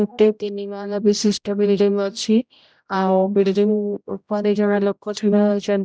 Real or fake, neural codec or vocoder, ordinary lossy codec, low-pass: fake; codec, 16 kHz, 0.5 kbps, X-Codec, HuBERT features, trained on general audio; none; none